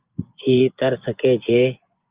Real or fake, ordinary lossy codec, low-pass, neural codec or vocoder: fake; Opus, 24 kbps; 3.6 kHz; vocoder, 24 kHz, 100 mel bands, Vocos